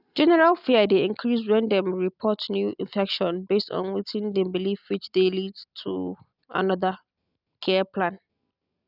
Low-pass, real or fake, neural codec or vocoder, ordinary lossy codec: 5.4 kHz; real; none; none